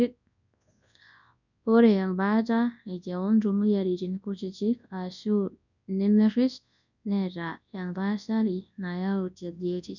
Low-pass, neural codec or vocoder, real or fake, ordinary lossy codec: 7.2 kHz; codec, 24 kHz, 0.9 kbps, WavTokenizer, large speech release; fake; none